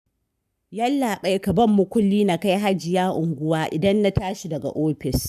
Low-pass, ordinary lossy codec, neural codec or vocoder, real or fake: 14.4 kHz; none; codec, 44.1 kHz, 7.8 kbps, Pupu-Codec; fake